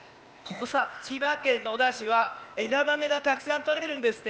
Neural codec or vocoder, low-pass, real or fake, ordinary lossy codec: codec, 16 kHz, 0.8 kbps, ZipCodec; none; fake; none